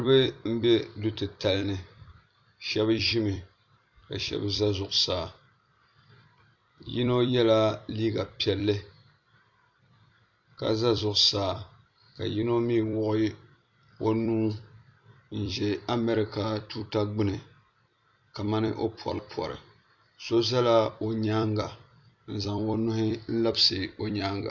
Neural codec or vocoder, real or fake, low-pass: vocoder, 44.1 kHz, 128 mel bands every 256 samples, BigVGAN v2; fake; 7.2 kHz